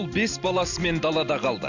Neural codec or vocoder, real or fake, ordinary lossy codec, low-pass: none; real; none; 7.2 kHz